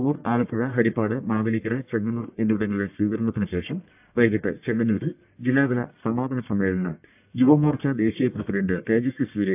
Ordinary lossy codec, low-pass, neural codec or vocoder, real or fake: AAC, 32 kbps; 3.6 kHz; codec, 44.1 kHz, 1.7 kbps, Pupu-Codec; fake